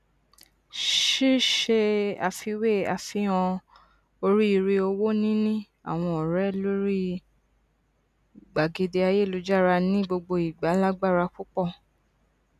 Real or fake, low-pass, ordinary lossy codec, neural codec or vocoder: real; 14.4 kHz; none; none